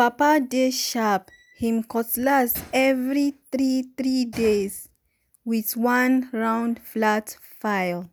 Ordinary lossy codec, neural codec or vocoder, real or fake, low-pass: none; none; real; none